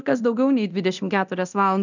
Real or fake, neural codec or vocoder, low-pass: fake; codec, 24 kHz, 0.9 kbps, DualCodec; 7.2 kHz